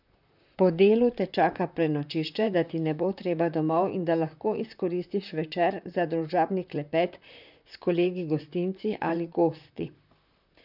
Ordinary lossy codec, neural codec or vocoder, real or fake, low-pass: none; vocoder, 44.1 kHz, 128 mel bands, Pupu-Vocoder; fake; 5.4 kHz